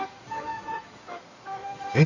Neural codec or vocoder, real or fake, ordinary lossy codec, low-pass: codec, 16 kHz in and 24 kHz out, 2.2 kbps, FireRedTTS-2 codec; fake; none; 7.2 kHz